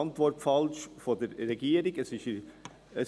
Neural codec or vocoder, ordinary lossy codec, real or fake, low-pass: none; none; real; none